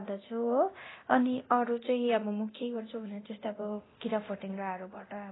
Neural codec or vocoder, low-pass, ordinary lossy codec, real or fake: codec, 24 kHz, 0.9 kbps, DualCodec; 7.2 kHz; AAC, 16 kbps; fake